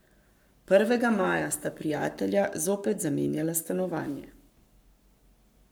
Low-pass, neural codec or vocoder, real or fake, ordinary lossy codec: none; codec, 44.1 kHz, 7.8 kbps, Pupu-Codec; fake; none